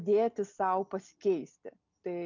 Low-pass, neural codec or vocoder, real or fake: 7.2 kHz; none; real